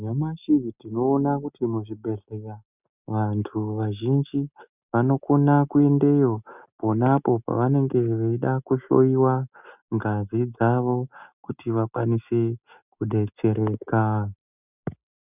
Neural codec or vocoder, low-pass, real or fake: none; 3.6 kHz; real